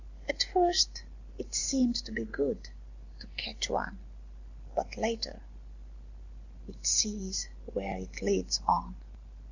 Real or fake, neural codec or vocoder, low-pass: real; none; 7.2 kHz